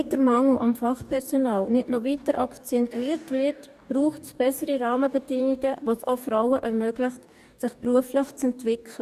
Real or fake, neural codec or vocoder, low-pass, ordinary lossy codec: fake; codec, 44.1 kHz, 2.6 kbps, DAC; 14.4 kHz; none